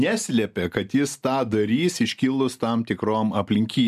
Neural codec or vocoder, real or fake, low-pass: none; real; 14.4 kHz